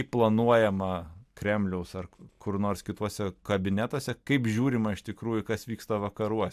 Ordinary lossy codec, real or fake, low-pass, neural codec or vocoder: AAC, 96 kbps; real; 14.4 kHz; none